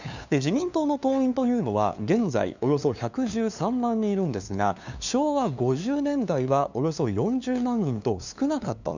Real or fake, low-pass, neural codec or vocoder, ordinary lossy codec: fake; 7.2 kHz; codec, 16 kHz, 2 kbps, FunCodec, trained on LibriTTS, 25 frames a second; none